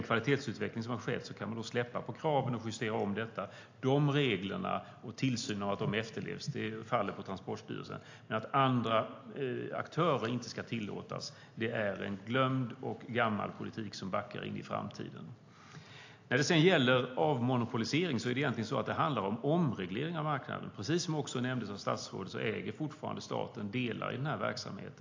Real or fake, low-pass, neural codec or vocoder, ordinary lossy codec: real; 7.2 kHz; none; AAC, 48 kbps